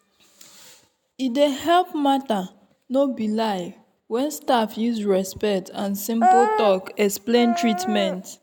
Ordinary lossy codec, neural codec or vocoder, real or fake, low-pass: none; none; real; 19.8 kHz